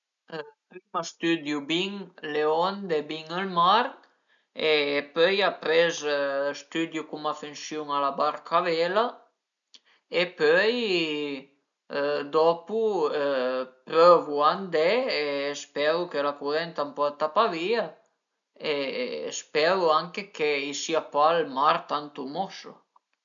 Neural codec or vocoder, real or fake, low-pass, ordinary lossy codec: none; real; 7.2 kHz; none